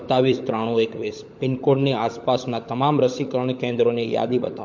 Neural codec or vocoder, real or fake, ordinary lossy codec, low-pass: codec, 16 kHz, 16 kbps, FunCodec, trained on LibriTTS, 50 frames a second; fake; MP3, 48 kbps; 7.2 kHz